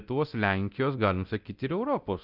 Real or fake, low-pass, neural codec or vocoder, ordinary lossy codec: fake; 5.4 kHz; codec, 24 kHz, 0.9 kbps, DualCodec; Opus, 32 kbps